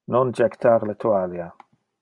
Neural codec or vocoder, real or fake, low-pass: none; real; 10.8 kHz